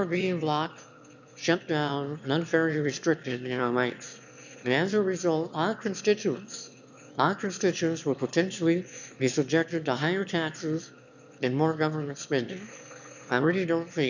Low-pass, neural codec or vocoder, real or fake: 7.2 kHz; autoencoder, 22.05 kHz, a latent of 192 numbers a frame, VITS, trained on one speaker; fake